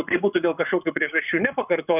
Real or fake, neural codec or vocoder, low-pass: fake; codec, 44.1 kHz, 7.8 kbps, Pupu-Codec; 3.6 kHz